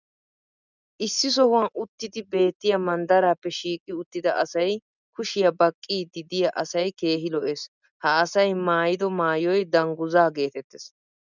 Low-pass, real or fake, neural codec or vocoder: 7.2 kHz; real; none